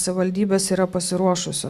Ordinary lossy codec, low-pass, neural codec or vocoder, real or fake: MP3, 96 kbps; 14.4 kHz; none; real